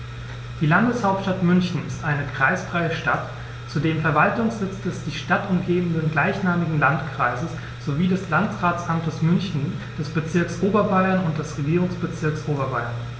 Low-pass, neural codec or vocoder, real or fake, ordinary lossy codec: none; none; real; none